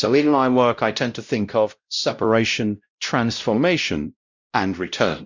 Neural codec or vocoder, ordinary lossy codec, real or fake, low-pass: codec, 16 kHz, 0.5 kbps, X-Codec, WavLM features, trained on Multilingual LibriSpeech; Opus, 64 kbps; fake; 7.2 kHz